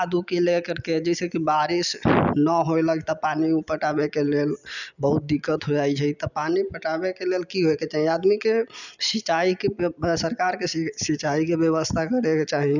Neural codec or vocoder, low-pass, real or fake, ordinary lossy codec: none; 7.2 kHz; real; none